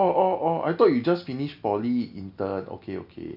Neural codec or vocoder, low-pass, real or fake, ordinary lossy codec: none; 5.4 kHz; real; MP3, 48 kbps